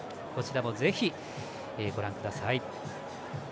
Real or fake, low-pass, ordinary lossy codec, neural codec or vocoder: real; none; none; none